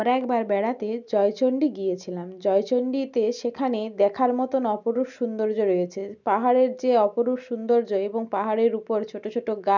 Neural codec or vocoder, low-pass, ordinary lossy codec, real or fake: none; 7.2 kHz; none; real